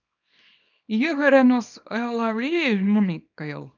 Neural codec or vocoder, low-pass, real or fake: codec, 24 kHz, 0.9 kbps, WavTokenizer, small release; 7.2 kHz; fake